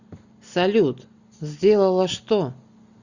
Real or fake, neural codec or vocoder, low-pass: real; none; 7.2 kHz